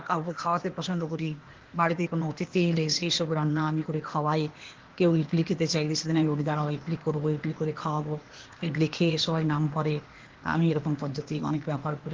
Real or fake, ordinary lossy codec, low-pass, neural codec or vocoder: fake; Opus, 16 kbps; 7.2 kHz; codec, 16 kHz, 0.8 kbps, ZipCodec